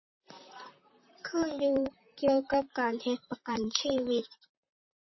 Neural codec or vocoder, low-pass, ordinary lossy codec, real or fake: vocoder, 44.1 kHz, 128 mel bands, Pupu-Vocoder; 7.2 kHz; MP3, 24 kbps; fake